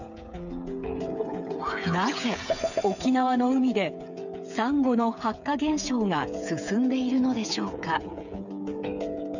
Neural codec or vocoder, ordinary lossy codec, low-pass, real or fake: codec, 16 kHz, 8 kbps, FreqCodec, smaller model; none; 7.2 kHz; fake